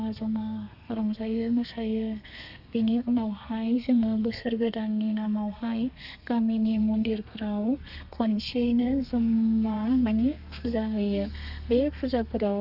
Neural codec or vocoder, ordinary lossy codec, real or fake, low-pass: codec, 32 kHz, 1.9 kbps, SNAC; none; fake; 5.4 kHz